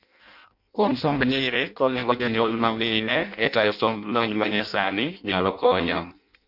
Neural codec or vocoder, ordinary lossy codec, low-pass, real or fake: codec, 16 kHz in and 24 kHz out, 0.6 kbps, FireRedTTS-2 codec; none; 5.4 kHz; fake